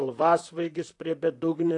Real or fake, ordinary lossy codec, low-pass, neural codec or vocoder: fake; AAC, 48 kbps; 10.8 kHz; vocoder, 44.1 kHz, 128 mel bands, Pupu-Vocoder